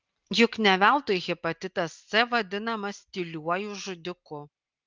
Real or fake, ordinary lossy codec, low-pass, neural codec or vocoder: real; Opus, 24 kbps; 7.2 kHz; none